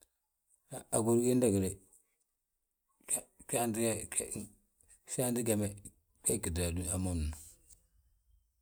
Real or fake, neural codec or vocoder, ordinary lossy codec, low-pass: real; none; none; none